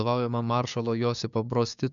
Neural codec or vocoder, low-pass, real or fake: codec, 16 kHz, 6 kbps, DAC; 7.2 kHz; fake